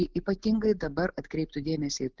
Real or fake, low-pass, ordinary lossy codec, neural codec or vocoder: real; 7.2 kHz; Opus, 16 kbps; none